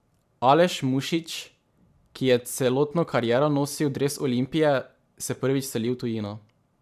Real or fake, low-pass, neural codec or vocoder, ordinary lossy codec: fake; 14.4 kHz; vocoder, 44.1 kHz, 128 mel bands every 512 samples, BigVGAN v2; AAC, 96 kbps